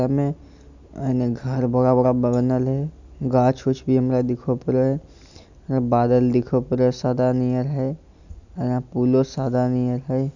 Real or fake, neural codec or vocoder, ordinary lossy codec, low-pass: real; none; none; 7.2 kHz